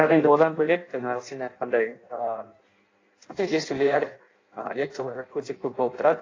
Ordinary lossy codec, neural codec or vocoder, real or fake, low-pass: AAC, 32 kbps; codec, 16 kHz in and 24 kHz out, 0.6 kbps, FireRedTTS-2 codec; fake; 7.2 kHz